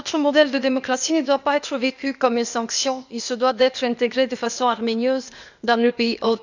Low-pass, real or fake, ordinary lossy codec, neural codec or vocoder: 7.2 kHz; fake; none; codec, 16 kHz, 0.8 kbps, ZipCodec